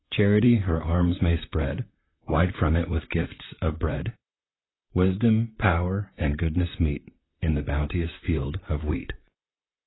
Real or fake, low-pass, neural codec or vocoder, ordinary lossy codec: fake; 7.2 kHz; vocoder, 44.1 kHz, 128 mel bands, Pupu-Vocoder; AAC, 16 kbps